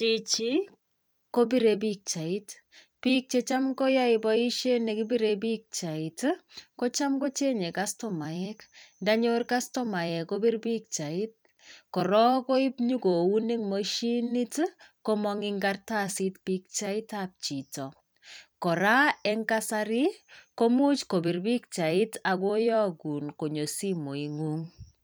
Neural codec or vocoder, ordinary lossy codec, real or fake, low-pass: vocoder, 44.1 kHz, 128 mel bands every 256 samples, BigVGAN v2; none; fake; none